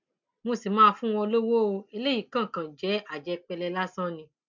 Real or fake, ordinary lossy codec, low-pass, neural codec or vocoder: real; MP3, 64 kbps; 7.2 kHz; none